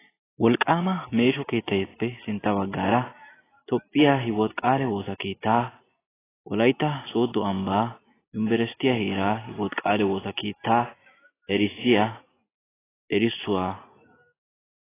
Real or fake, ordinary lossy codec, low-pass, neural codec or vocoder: real; AAC, 16 kbps; 3.6 kHz; none